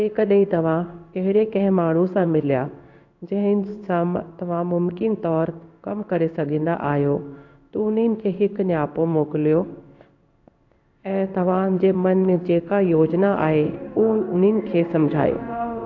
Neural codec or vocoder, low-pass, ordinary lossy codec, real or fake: codec, 16 kHz in and 24 kHz out, 1 kbps, XY-Tokenizer; 7.2 kHz; AAC, 48 kbps; fake